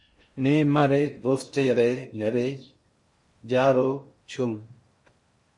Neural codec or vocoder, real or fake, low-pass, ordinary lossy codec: codec, 16 kHz in and 24 kHz out, 0.6 kbps, FocalCodec, streaming, 4096 codes; fake; 10.8 kHz; MP3, 48 kbps